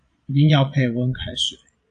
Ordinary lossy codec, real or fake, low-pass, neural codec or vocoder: AAC, 64 kbps; fake; 9.9 kHz; vocoder, 22.05 kHz, 80 mel bands, Vocos